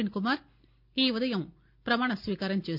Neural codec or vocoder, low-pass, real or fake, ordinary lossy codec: none; 5.4 kHz; real; none